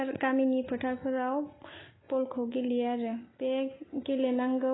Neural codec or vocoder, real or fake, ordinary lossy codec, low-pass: none; real; AAC, 16 kbps; 7.2 kHz